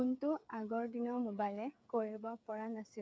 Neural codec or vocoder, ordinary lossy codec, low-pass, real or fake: codec, 16 kHz, 8 kbps, FreqCodec, smaller model; none; none; fake